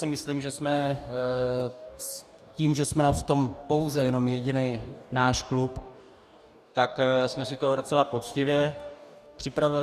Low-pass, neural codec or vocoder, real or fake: 14.4 kHz; codec, 44.1 kHz, 2.6 kbps, DAC; fake